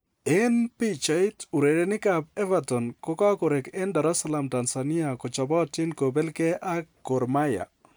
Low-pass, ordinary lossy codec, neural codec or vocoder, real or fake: none; none; none; real